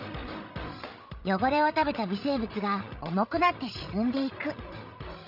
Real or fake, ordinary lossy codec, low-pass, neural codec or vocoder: fake; none; 5.4 kHz; codec, 16 kHz, 16 kbps, FreqCodec, larger model